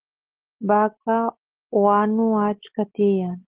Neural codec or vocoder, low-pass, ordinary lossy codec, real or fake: none; 3.6 kHz; Opus, 16 kbps; real